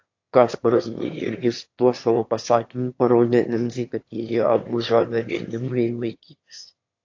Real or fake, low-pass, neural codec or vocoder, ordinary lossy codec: fake; 7.2 kHz; autoencoder, 22.05 kHz, a latent of 192 numbers a frame, VITS, trained on one speaker; AAC, 48 kbps